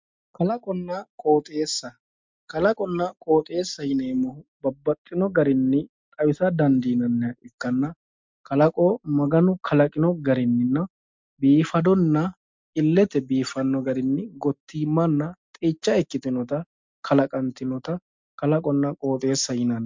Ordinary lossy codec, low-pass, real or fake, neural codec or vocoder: MP3, 64 kbps; 7.2 kHz; real; none